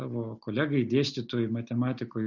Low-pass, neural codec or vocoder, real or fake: 7.2 kHz; none; real